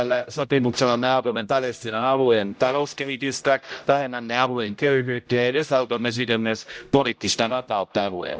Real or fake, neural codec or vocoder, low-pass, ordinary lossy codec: fake; codec, 16 kHz, 0.5 kbps, X-Codec, HuBERT features, trained on general audio; none; none